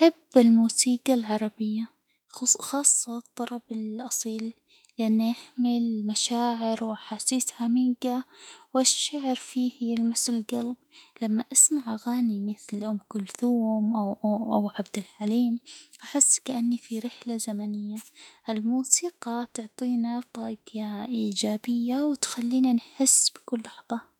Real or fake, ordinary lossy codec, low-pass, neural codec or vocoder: fake; none; 19.8 kHz; autoencoder, 48 kHz, 32 numbers a frame, DAC-VAE, trained on Japanese speech